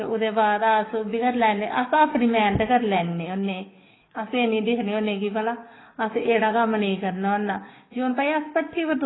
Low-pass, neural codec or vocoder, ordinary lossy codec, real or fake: 7.2 kHz; codec, 44.1 kHz, 7.8 kbps, DAC; AAC, 16 kbps; fake